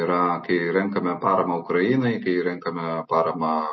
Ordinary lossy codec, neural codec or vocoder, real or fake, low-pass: MP3, 24 kbps; none; real; 7.2 kHz